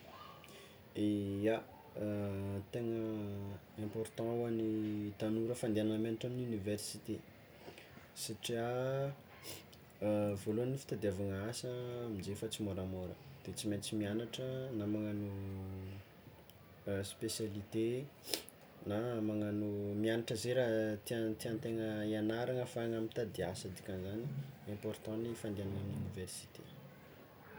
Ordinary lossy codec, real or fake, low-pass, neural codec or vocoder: none; real; none; none